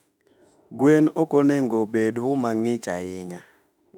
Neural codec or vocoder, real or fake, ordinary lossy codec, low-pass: autoencoder, 48 kHz, 32 numbers a frame, DAC-VAE, trained on Japanese speech; fake; none; 19.8 kHz